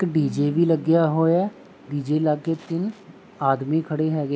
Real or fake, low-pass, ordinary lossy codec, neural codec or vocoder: real; none; none; none